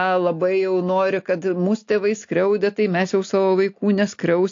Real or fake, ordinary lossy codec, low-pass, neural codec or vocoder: real; AAC, 48 kbps; 7.2 kHz; none